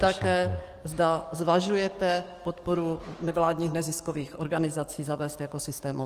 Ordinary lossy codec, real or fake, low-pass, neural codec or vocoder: Opus, 24 kbps; fake; 14.4 kHz; codec, 44.1 kHz, 7.8 kbps, DAC